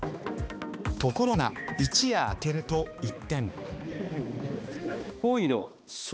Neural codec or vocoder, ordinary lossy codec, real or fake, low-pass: codec, 16 kHz, 2 kbps, X-Codec, HuBERT features, trained on balanced general audio; none; fake; none